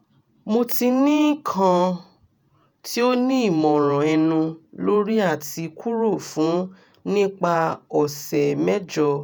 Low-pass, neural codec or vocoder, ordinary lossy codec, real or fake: none; vocoder, 48 kHz, 128 mel bands, Vocos; none; fake